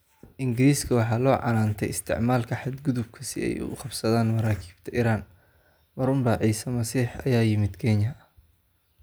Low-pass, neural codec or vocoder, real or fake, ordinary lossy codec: none; none; real; none